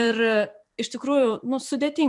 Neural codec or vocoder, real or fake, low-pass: vocoder, 44.1 kHz, 128 mel bands every 256 samples, BigVGAN v2; fake; 10.8 kHz